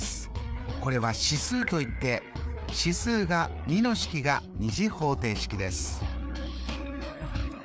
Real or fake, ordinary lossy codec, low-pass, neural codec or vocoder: fake; none; none; codec, 16 kHz, 4 kbps, FreqCodec, larger model